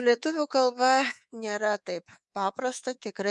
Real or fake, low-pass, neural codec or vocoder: fake; 10.8 kHz; codec, 24 kHz, 3.1 kbps, DualCodec